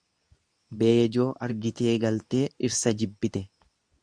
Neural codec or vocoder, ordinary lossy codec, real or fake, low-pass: codec, 24 kHz, 0.9 kbps, WavTokenizer, medium speech release version 2; Opus, 64 kbps; fake; 9.9 kHz